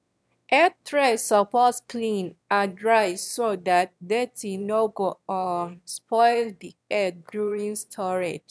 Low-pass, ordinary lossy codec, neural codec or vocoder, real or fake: none; none; autoencoder, 22.05 kHz, a latent of 192 numbers a frame, VITS, trained on one speaker; fake